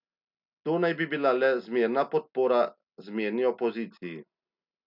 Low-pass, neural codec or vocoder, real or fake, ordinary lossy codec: 5.4 kHz; none; real; none